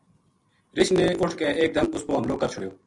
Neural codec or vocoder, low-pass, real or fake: none; 10.8 kHz; real